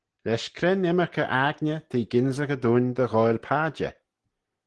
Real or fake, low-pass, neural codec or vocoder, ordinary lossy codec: real; 10.8 kHz; none; Opus, 16 kbps